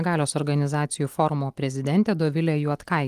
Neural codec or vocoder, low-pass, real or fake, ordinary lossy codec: none; 14.4 kHz; real; Opus, 24 kbps